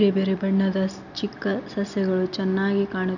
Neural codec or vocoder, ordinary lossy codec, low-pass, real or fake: none; none; 7.2 kHz; real